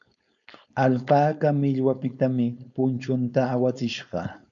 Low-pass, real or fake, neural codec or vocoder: 7.2 kHz; fake; codec, 16 kHz, 4.8 kbps, FACodec